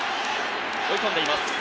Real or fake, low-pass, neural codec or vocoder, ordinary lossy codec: real; none; none; none